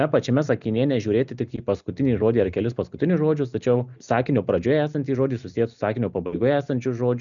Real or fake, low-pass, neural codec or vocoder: real; 7.2 kHz; none